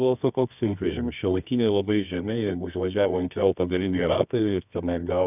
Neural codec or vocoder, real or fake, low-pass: codec, 24 kHz, 0.9 kbps, WavTokenizer, medium music audio release; fake; 3.6 kHz